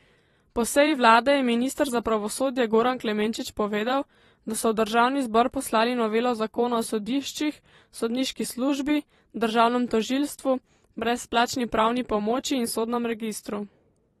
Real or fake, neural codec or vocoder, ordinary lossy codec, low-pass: fake; vocoder, 44.1 kHz, 128 mel bands every 512 samples, BigVGAN v2; AAC, 32 kbps; 19.8 kHz